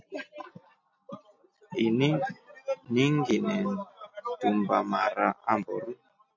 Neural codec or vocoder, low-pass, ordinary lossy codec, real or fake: none; 7.2 kHz; MP3, 48 kbps; real